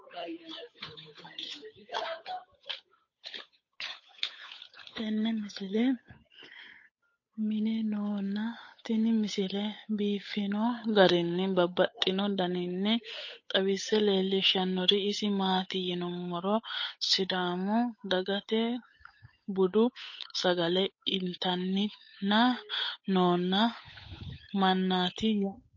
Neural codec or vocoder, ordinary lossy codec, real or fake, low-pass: codec, 16 kHz, 8 kbps, FunCodec, trained on Chinese and English, 25 frames a second; MP3, 32 kbps; fake; 7.2 kHz